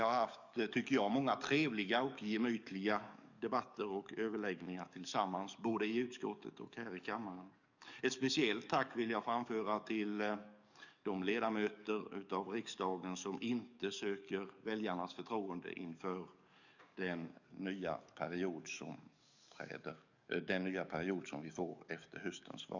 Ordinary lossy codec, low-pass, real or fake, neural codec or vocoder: none; 7.2 kHz; fake; codec, 44.1 kHz, 7.8 kbps, DAC